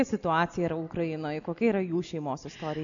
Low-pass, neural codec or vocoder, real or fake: 7.2 kHz; none; real